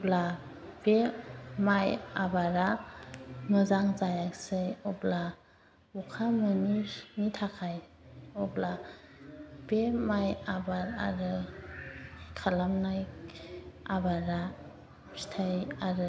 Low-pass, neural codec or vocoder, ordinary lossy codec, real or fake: none; none; none; real